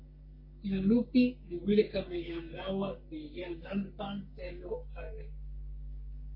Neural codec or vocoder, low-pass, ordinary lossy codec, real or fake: codec, 44.1 kHz, 3.4 kbps, Pupu-Codec; 5.4 kHz; MP3, 32 kbps; fake